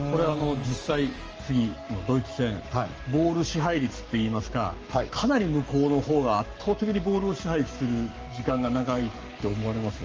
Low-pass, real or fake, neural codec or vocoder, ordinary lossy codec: 7.2 kHz; fake; codec, 44.1 kHz, 7.8 kbps, Pupu-Codec; Opus, 24 kbps